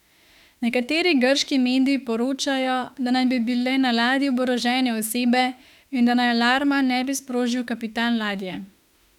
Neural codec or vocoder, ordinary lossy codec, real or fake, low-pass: autoencoder, 48 kHz, 32 numbers a frame, DAC-VAE, trained on Japanese speech; none; fake; 19.8 kHz